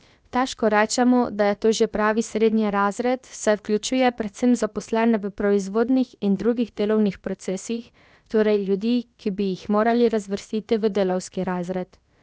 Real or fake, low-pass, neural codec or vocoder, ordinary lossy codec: fake; none; codec, 16 kHz, about 1 kbps, DyCAST, with the encoder's durations; none